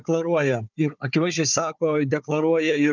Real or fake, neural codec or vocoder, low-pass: fake; codec, 16 kHz, 4 kbps, FunCodec, trained on Chinese and English, 50 frames a second; 7.2 kHz